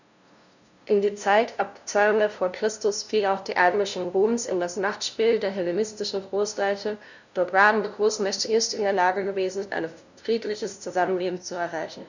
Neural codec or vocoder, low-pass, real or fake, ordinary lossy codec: codec, 16 kHz, 0.5 kbps, FunCodec, trained on LibriTTS, 25 frames a second; 7.2 kHz; fake; none